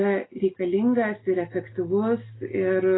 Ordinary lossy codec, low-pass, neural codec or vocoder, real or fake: AAC, 16 kbps; 7.2 kHz; none; real